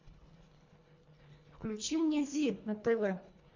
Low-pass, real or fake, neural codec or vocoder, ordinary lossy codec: 7.2 kHz; fake; codec, 24 kHz, 1.5 kbps, HILCodec; MP3, 48 kbps